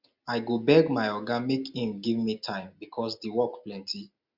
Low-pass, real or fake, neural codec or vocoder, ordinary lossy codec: 5.4 kHz; real; none; Opus, 64 kbps